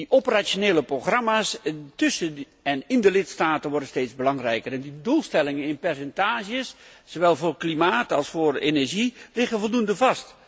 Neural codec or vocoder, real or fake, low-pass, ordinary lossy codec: none; real; none; none